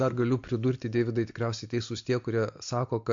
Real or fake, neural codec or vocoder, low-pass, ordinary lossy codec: real; none; 7.2 kHz; MP3, 48 kbps